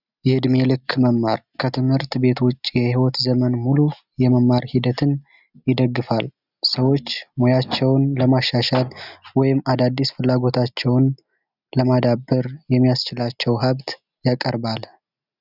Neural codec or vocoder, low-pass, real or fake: none; 5.4 kHz; real